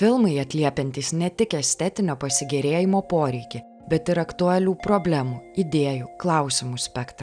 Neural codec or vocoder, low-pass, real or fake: none; 9.9 kHz; real